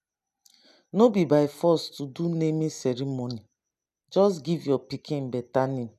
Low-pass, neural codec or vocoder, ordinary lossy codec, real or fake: 14.4 kHz; none; none; real